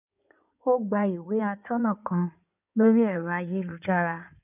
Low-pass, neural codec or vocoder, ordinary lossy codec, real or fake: 3.6 kHz; codec, 16 kHz in and 24 kHz out, 2.2 kbps, FireRedTTS-2 codec; none; fake